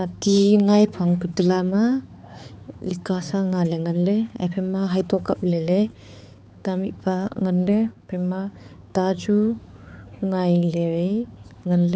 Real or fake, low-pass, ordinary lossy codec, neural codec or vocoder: fake; none; none; codec, 16 kHz, 4 kbps, X-Codec, HuBERT features, trained on balanced general audio